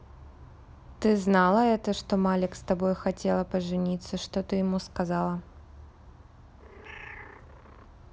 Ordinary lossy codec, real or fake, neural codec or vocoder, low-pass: none; real; none; none